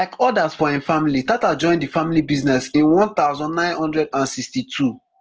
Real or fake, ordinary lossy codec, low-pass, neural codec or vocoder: real; Opus, 16 kbps; 7.2 kHz; none